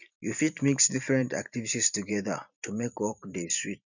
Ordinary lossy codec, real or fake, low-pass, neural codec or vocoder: none; fake; 7.2 kHz; vocoder, 44.1 kHz, 128 mel bands every 512 samples, BigVGAN v2